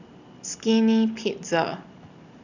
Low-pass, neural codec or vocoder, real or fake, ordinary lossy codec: 7.2 kHz; none; real; none